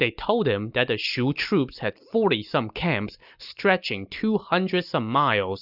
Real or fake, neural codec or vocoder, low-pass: real; none; 5.4 kHz